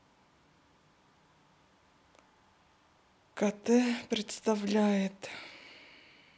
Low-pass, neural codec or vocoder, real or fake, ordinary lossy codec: none; none; real; none